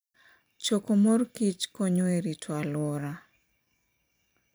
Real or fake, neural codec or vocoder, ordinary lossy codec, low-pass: fake; vocoder, 44.1 kHz, 128 mel bands every 256 samples, BigVGAN v2; none; none